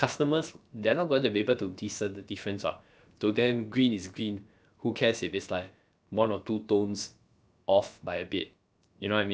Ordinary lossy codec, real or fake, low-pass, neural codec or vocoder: none; fake; none; codec, 16 kHz, about 1 kbps, DyCAST, with the encoder's durations